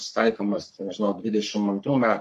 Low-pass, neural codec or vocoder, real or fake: 14.4 kHz; codec, 44.1 kHz, 3.4 kbps, Pupu-Codec; fake